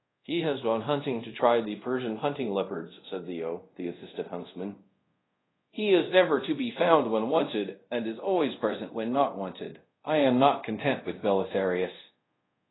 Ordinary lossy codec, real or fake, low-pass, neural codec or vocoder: AAC, 16 kbps; fake; 7.2 kHz; codec, 24 kHz, 0.5 kbps, DualCodec